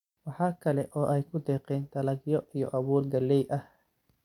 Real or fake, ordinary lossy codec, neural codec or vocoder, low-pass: real; none; none; 19.8 kHz